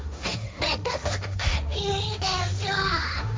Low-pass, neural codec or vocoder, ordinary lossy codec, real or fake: none; codec, 16 kHz, 1.1 kbps, Voila-Tokenizer; none; fake